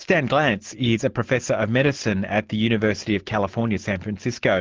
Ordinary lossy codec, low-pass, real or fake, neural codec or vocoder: Opus, 16 kbps; 7.2 kHz; real; none